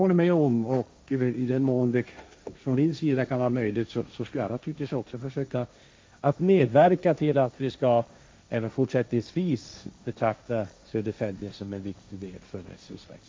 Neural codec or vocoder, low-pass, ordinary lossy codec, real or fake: codec, 16 kHz, 1.1 kbps, Voila-Tokenizer; none; none; fake